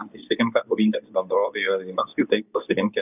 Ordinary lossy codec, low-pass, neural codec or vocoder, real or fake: AAC, 32 kbps; 3.6 kHz; codec, 24 kHz, 0.9 kbps, WavTokenizer, medium speech release version 2; fake